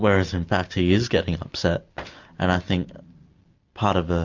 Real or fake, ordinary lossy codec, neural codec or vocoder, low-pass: real; MP3, 64 kbps; none; 7.2 kHz